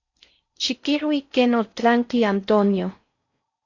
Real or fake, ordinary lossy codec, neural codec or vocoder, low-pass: fake; AAC, 48 kbps; codec, 16 kHz in and 24 kHz out, 0.6 kbps, FocalCodec, streaming, 4096 codes; 7.2 kHz